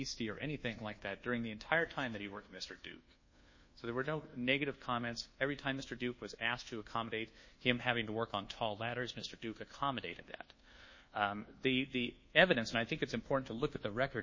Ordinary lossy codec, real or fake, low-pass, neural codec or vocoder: MP3, 32 kbps; fake; 7.2 kHz; codec, 24 kHz, 1.2 kbps, DualCodec